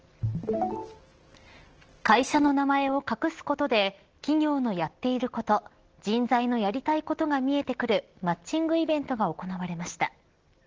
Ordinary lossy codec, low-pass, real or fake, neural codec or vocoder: Opus, 16 kbps; 7.2 kHz; real; none